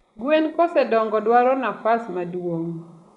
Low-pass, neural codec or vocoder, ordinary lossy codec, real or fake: 10.8 kHz; vocoder, 24 kHz, 100 mel bands, Vocos; none; fake